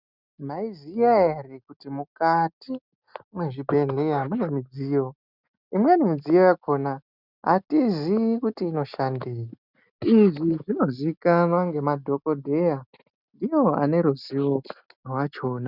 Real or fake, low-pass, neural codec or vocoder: real; 5.4 kHz; none